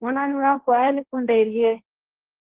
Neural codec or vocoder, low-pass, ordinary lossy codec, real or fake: codec, 16 kHz, 1.1 kbps, Voila-Tokenizer; 3.6 kHz; Opus, 16 kbps; fake